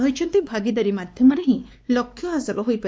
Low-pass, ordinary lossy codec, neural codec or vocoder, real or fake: none; none; codec, 16 kHz, 2 kbps, X-Codec, WavLM features, trained on Multilingual LibriSpeech; fake